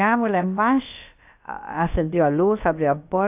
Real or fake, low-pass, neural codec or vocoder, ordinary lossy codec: fake; 3.6 kHz; codec, 16 kHz, about 1 kbps, DyCAST, with the encoder's durations; AAC, 32 kbps